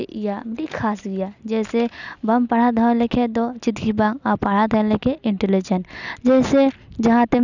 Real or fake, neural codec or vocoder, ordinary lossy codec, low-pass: real; none; none; 7.2 kHz